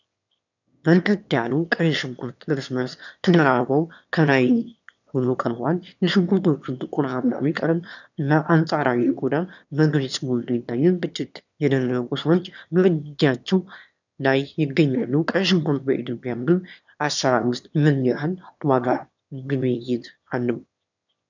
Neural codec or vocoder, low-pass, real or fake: autoencoder, 22.05 kHz, a latent of 192 numbers a frame, VITS, trained on one speaker; 7.2 kHz; fake